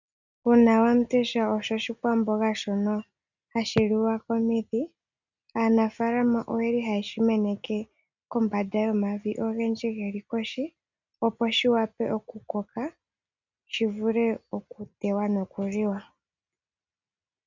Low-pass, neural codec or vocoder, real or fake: 7.2 kHz; none; real